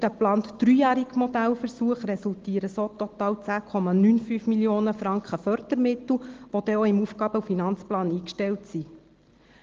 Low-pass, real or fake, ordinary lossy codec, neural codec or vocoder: 7.2 kHz; real; Opus, 16 kbps; none